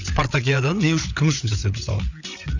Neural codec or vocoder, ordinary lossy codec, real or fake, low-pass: codec, 16 kHz, 8 kbps, FreqCodec, larger model; none; fake; 7.2 kHz